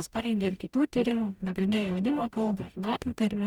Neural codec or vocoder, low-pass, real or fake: codec, 44.1 kHz, 0.9 kbps, DAC; 19.8 kHz; fake